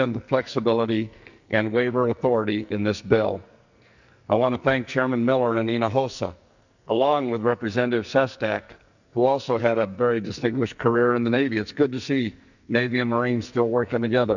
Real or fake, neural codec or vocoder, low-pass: fake; codec, 44.1 kHz, 2.6 kbps, SNAC; 7.2 kHz